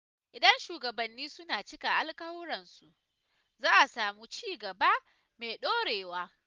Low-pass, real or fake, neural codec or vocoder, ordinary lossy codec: 7.2 kHz; real; none; Opus, 32 kbps